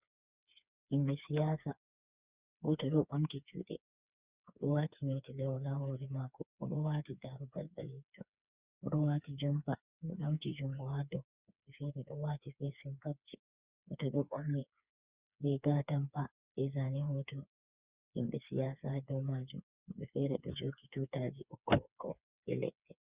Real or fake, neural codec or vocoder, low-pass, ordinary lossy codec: fake; codec, 16 kHz, 4 kbps, FreqCodec, smaller model; 3.6 kHz; Opus, 64 kbps